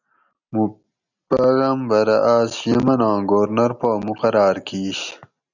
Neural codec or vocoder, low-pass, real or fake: none; 7.2 kHz; real